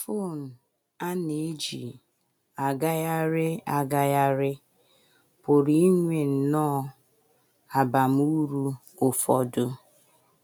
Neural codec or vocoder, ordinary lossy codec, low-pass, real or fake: none; none; none; real